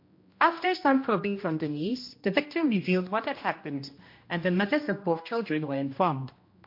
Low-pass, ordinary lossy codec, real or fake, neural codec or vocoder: 5.4 kHz; MP3, 32 kbps; fake; codec, 16 kHz, 1 kbps, X-Codec, HuBERT features, trained on general audio